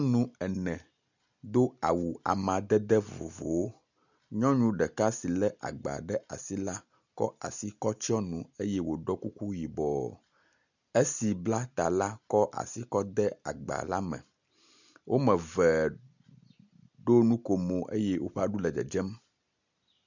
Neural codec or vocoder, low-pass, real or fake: none; 7.2 kHz; real